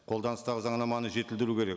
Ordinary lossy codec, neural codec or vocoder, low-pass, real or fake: none; none; none; real